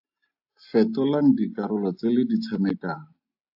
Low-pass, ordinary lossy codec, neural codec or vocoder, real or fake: 5.4 kHz; AAC, 48 kbps; none; real